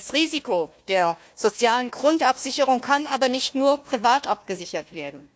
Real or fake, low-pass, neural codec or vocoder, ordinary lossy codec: fake; none; codec, 16 kHz, 1 kbps, FunCodec, trained on Chinese and English, 50 frames a second; none